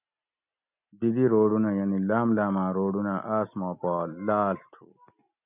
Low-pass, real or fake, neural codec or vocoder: 3.6 kHz; real; none